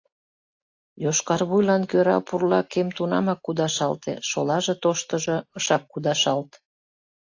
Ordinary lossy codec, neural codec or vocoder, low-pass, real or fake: AAC, 48 kbps; none; 7.2 kHz; real